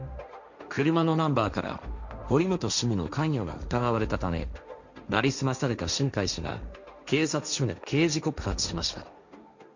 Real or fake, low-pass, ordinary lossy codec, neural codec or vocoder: fake; 7.2 kHz; none; codec, 16 kHz, 1.1 kbps, Voila-Tokenizer